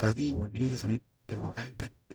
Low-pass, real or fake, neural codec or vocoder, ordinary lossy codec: none; fake; codec, 44.1 kHz, 0.9 kbps, DAC; none